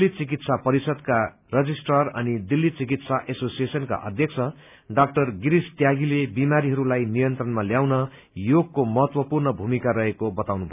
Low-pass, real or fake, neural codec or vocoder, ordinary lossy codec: 3.6 kHz; real; none; none